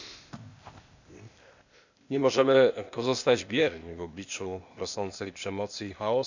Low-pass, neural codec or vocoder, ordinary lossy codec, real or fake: 7.2 kHz; codec, 16 kHz, 0.8 kbps, ZipCodec; none; fake